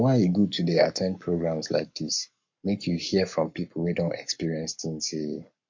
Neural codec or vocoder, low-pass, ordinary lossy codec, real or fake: codec, 44.1 kHz, 7.8 kbps, Pupu-Codec; 7.2 kHz; MP3, 48 kbps; fake